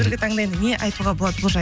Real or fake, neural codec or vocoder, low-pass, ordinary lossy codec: real; none; none; none